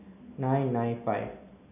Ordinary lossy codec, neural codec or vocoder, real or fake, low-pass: none; none; real; 3.6 kHz